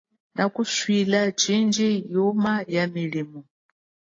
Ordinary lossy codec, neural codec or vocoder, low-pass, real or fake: AAC, 32 kbps; none; 7.2 kHz; real